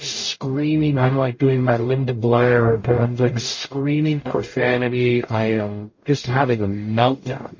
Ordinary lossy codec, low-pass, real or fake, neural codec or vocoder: MP3, 32 kbps; 7.2 kHz; fake; codec, 44.1 kHz, 0.9 kbps, DAC